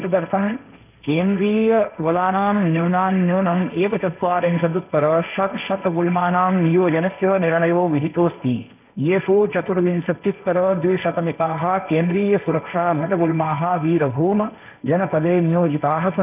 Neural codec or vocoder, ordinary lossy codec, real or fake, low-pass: codec, 16 kHz, 1.1 kbps, Voila-Tokenizer; none; fake; 3.6 kHz